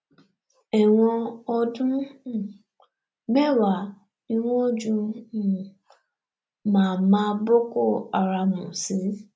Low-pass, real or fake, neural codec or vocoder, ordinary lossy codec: none; real; none; none